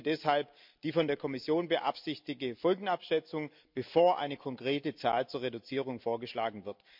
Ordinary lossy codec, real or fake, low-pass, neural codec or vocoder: MP3, 48 kbps; real; 5.4 kHz; none